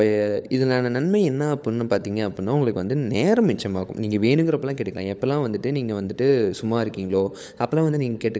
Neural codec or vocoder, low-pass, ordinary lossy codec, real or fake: codec, 16 kHz, 16 kbps, FunCodec, trained on LibriTTS, 50 frames a second; none; none; fake